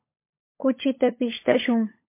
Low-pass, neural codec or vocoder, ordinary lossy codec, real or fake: 3.6 kHz; codec, 16 kHz, 16 kbps, FunCodec, trained on LibriTTS, 50 frames a second; MP3, 24 kbps; fake